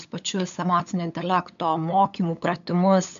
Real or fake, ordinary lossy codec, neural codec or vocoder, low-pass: fake; MP3, 64 kbps; codec, 16 kHz, 8 kbps, FunCodec, trained on LibriTTS, 25 frames a second; 7.2 kHz